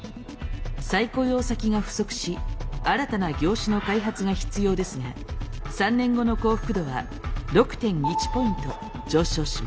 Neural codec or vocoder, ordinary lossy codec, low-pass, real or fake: none; none; none; real